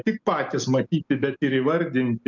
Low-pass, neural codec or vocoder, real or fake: 7.2 kHz; none; real